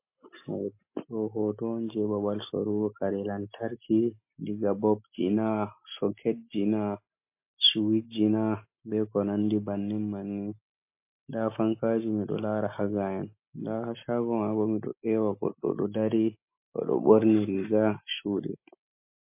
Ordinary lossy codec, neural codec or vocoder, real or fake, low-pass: MP3, 24 kbps; none; real; 3.6 kHz